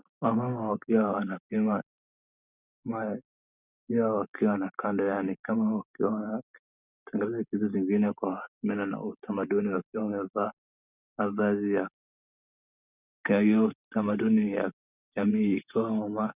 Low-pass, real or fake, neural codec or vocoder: 3.6 kHz; real; none